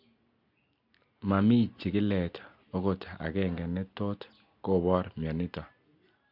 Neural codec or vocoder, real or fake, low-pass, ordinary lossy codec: none; real; 5.4 kHz; MP3, 48 kbps